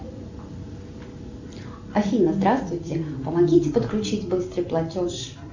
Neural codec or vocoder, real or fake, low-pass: none; real; 7.2 kHz